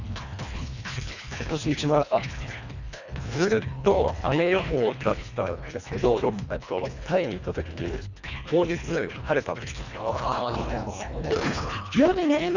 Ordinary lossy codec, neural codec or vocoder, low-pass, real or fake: none; codec, 24 kHz, 1.5 kbps, HILCodec; 7.2 kHz; fake